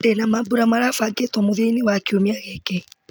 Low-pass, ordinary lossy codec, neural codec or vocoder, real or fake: none; none; none; real